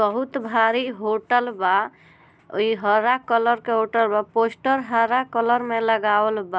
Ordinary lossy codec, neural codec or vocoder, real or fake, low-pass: none; none; real; none